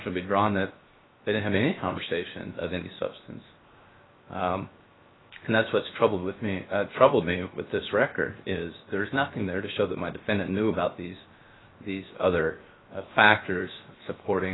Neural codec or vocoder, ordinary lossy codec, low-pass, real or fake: codec, 16 kHz, 0.7 kbps, FocalCodec; AAC, 16 kbps; 7.2 kHz; fake